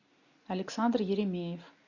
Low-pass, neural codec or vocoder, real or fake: 7.2 kHz; none; real